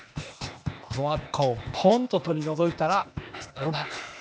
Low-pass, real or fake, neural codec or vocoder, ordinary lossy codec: none; fake; codec, 16 kHz, 0.8 kbps, ZipCodec; none